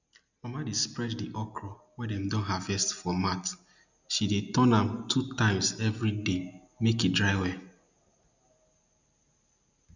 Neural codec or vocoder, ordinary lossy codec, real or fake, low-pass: none; none; real; 7.2 kHz